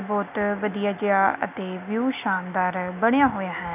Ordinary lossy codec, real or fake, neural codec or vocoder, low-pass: none; real; none; 3.6 kHz